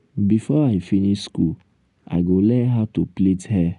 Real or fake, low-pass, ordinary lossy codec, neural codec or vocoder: real; 10.8 kHz; none; none